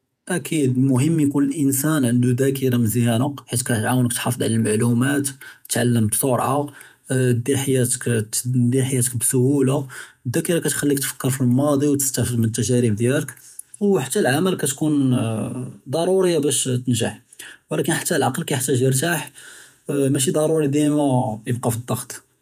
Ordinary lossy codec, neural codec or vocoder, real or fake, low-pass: none; vocoder, 48 kHz, 128 mel bands, Vocos; fake; 14.4 kHz